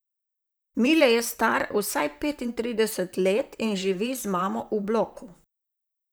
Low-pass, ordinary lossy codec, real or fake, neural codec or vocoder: none; none; fake; vocoder, 44.1 kHz, 128 mel bands, Pupu-Vocoder